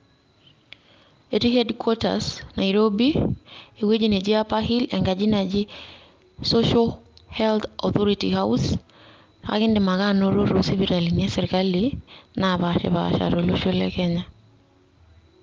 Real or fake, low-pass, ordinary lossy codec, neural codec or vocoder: real; 7.2 kHz; Opus, 24 kbps; none